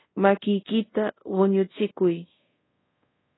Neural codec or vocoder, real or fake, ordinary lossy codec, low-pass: codec, 24 kHz, 0.5 kbps, DualCodec; fake; AAC, 16 kbps; 7.2 kHz